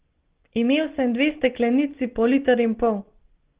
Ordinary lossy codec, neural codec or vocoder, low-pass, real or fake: Opus, 16 kbps; none; 3.6 kHz; real